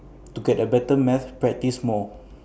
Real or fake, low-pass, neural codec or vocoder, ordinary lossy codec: real; none; none; none